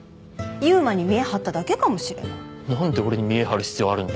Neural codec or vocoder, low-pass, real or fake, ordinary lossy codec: none; none; real; none